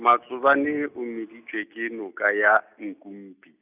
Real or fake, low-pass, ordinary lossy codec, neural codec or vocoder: real; 3.6 kHz; none; none